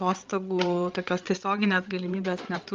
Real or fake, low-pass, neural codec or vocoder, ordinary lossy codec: fake; 7.2 kHz; codec, 16 kHz, 8 kbps, FreqCodec, larger model; Opus, 32 kbps